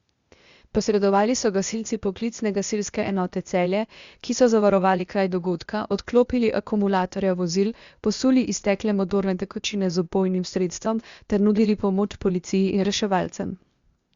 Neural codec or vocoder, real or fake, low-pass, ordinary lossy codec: codec, 16 kHz, 0.8 kbps, ZipCodec; fake; 7.2 kHz; Opus, 64 kbps